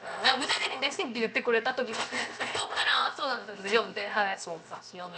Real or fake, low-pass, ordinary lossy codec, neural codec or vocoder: fake; none; none; codec, 16 kHz, 0.7 kbps, FocalCodec